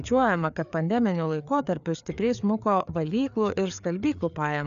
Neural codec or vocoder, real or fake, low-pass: codec, 16 kHz, 4 kbps, FreqCodec, larger model; fake; 7.2 kHz